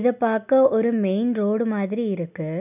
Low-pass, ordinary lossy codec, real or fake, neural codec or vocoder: 3.6 kHz; none; real; none